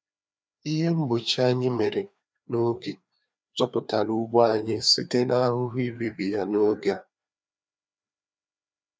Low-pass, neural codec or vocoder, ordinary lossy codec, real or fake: none; codec, 16 kHz, 2 kbps, FreqCodec, larger model; none; fake